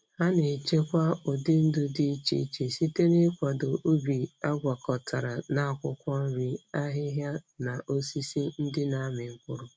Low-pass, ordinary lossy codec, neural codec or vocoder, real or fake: none; none; none; real